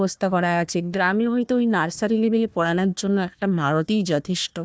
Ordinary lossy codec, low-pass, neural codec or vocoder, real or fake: none; none; codec, 16 kHz, 1 kbps, FunCodec, trained on Chinese and English, 50 frames a second; fake